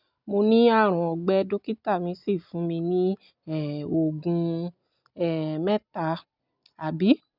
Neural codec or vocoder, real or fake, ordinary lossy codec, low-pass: none; real; none; 5.4 kHz